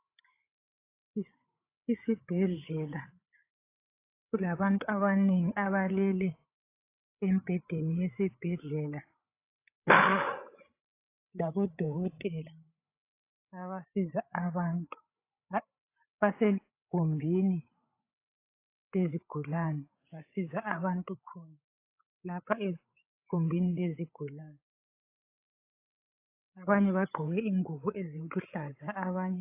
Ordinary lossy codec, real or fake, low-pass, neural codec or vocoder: AAC, 24 kbps; fake; 3.6 kHz; codec, 16 kHz, 8 kbps, FreqCodec, larger model